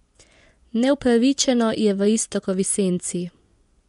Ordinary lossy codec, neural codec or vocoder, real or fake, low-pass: MP3, 64 kbps; vocoder, 24 kHz, 100 mel bands, Vocos; fake; 10.8 kHz